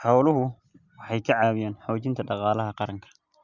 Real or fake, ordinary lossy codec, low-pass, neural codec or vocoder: real; Opus, 64 kbps; 7.2 kHz; none